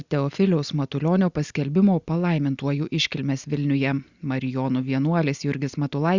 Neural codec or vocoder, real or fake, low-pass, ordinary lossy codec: none; real; 7.2 kHz; Opus, 64 kbps